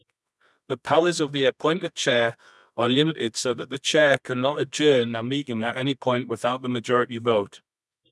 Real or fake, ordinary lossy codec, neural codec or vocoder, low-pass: fake; none; codec, 24 kHz, 0.9 kbps, WavTokenizer, medium music audio release; none